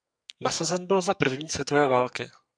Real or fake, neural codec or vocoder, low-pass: fake; codec, 44.1 kHz, 2.6 kbps, SNAC; 9.9 kHz